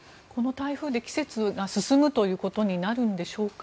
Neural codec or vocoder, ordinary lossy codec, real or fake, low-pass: none; none; real; none